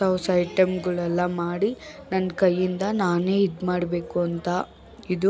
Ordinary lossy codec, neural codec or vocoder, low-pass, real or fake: none; none; none; real